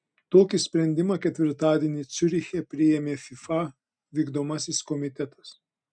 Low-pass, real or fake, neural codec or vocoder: 9.9 kHz; real; none